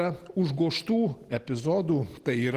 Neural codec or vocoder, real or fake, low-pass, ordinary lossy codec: none; real; 14.4 kHz; Opus, 16 kbps